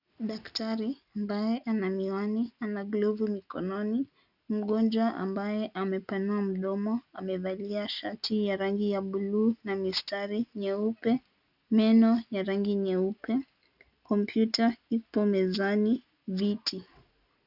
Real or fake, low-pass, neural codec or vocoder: real; 5.4 kHz; none